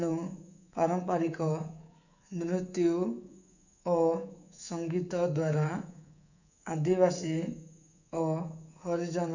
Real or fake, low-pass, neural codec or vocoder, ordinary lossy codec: fake; 7.2 kHz; codec, 24 kHz, 3.1 kbps, DualCodec; none